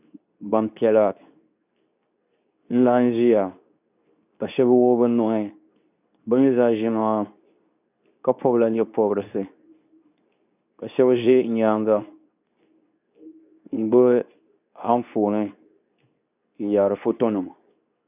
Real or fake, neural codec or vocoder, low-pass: fake; codec, 24 kHz, 0.9 kbps, WavTokenizer, medium speech release version 2; 3.6 kHz